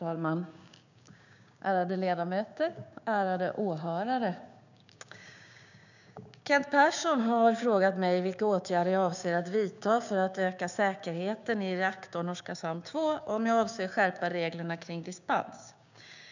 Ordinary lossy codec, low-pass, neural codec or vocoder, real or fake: none; 7.2 kHz; codec, 16 kHz, 6 kbps, DAC; fake